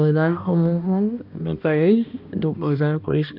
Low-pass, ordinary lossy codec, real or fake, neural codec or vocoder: 5.4 kHz; none; fake; codec, 16 kHz, 1 kbps, X-Codec, HuBERT features, trained on balanced general audio